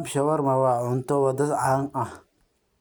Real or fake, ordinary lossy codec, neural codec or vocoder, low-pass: real; none; none; none